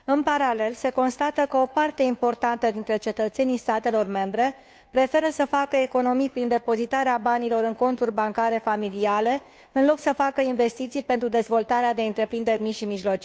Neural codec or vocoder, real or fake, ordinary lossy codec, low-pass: codec, 16 kHz, 2 kbps, FunCodec, trained on Chinese and English, 25 frames a second; fake; none; none